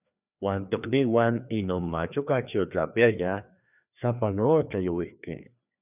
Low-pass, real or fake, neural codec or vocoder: 3.6 kHz; fake; codec, 16 kHz, 2 kbps, FreqCodec, larger model